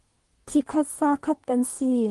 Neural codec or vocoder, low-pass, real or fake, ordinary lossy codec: codec, 24 kHz, 1 kbps, SNAC; 10.8 kHz; fake; Opus, 24 kbps